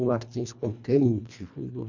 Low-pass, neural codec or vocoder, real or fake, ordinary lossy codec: 7.2 kHz; codec, 24 kHz, 1.5 kbps, HILCodec; fake; none